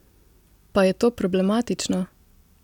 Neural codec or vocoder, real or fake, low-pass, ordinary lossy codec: none; real; 19.8 kHz; none